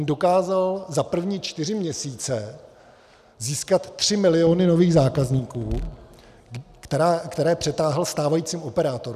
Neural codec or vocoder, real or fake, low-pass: none; real; 14.4 kHz